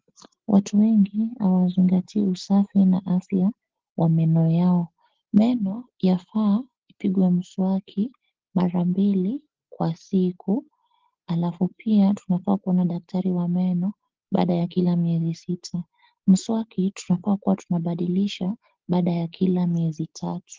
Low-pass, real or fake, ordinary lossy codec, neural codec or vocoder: 7.2 kHz; real; Opus, 16 kbps; none